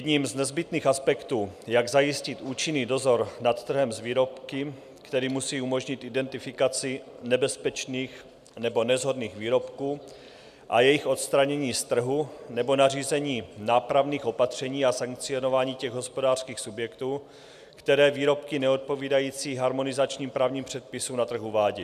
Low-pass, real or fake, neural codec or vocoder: 14.4 kHz; real; none